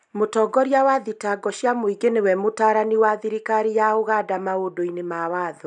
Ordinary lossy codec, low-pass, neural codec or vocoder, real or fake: none; 10.8 kHz; none; real